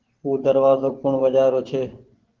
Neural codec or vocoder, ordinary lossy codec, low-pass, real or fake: vocoder, 24 kHz, 100 mel bands, Vocos; Opus, 16 kbps; 7.2 kHz; fake